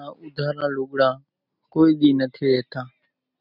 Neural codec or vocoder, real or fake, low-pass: none; real; 5.4 kHz